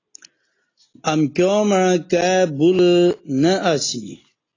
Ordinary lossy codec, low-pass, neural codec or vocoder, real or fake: AAC, 32 kbps; 7.2 kHz; none; real